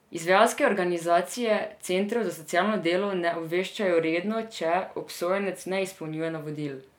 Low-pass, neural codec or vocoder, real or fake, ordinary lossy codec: 19.8 kHz; none; real; none